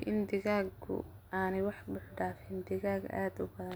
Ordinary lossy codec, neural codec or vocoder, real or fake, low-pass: none; none; real; none